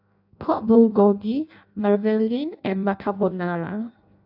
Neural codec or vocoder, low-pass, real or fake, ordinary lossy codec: codec, 16 kHz in and 24 kHz out, 0.6 kbps, FireRedTTS-2 codec; 5.4 kHz; fake; AAC, 48 kbps